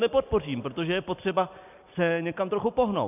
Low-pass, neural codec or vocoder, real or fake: 3.6 kHz; none; real